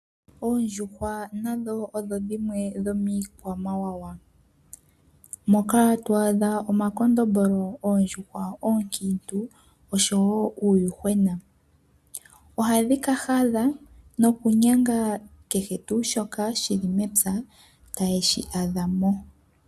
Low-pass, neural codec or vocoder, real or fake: 14.4 kHz; none; real